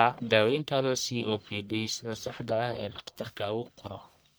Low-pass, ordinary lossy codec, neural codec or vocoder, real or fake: none; none; codec, 44.1 kHz, 1.7 kbps, Pupu-Codec; fake